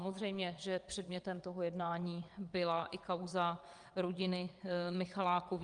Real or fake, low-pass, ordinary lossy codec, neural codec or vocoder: fake; 9.9 kHz; Opus, 32 kbps; vocoder, 22.05 kHz, 80 mel bands, WaveNeXt